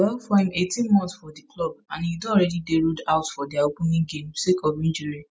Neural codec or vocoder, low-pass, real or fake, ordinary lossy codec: none; none; real; none